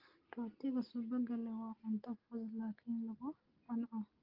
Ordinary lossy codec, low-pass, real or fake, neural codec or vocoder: Opus, 32 kbps; 5.4 kHz; real; none